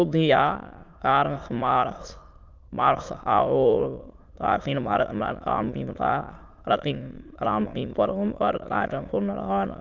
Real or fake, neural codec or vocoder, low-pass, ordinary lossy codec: fake; autoencoder, 22.05 kHz, a latent of 192 numbers a frame, VITS, trained on many speakers; 7.2 kHz; Opus, 32 kbps